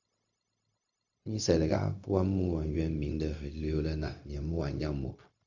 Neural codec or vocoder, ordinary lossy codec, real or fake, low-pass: codec, 16 kHz, 0.4 kbps, LongCat-Audio-Codec; AAC, 48 kbps; fake; 7.2 kHz